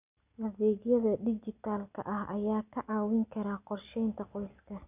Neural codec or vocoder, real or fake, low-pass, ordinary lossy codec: none; real; 3.6 kHz; none